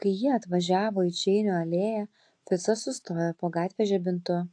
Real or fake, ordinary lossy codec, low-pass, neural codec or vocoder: real; AAC, 48 kbps; 9.9 kHz; none